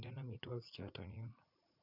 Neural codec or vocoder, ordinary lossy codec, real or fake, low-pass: vocoder, 44.1 kHz, 80 mel bands, Vocos; none; fake; 5.4 kHz